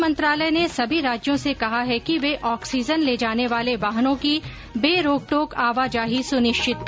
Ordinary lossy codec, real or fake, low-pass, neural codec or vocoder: none; real; none; none